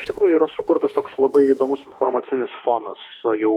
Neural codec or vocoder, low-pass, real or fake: autoencoder, 48 kHz, 32 numbers a frame, DAC-VAE, trained on Japanese speech; 19.8 kHz; fake